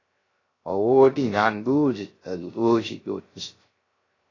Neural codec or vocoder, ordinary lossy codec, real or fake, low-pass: codec, 16 kHz, 0.3 kbps, FocalCodec; AAC, 32 kbps; fake; 7.2 kHz